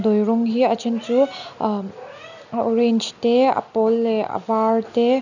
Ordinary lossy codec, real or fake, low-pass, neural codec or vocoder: none; real; 7.2 kHz; none